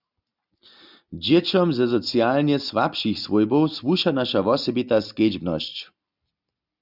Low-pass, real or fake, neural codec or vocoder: 5.4 kHz; real; none